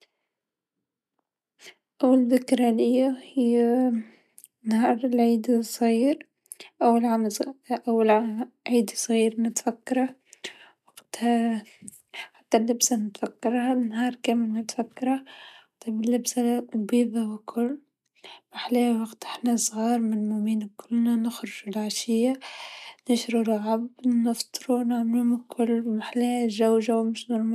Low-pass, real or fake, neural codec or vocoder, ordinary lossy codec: 14.4 kHz; real; none; none